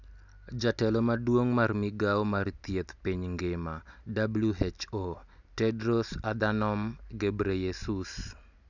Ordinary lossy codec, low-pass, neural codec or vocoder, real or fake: none; 7.2 kHz; none; real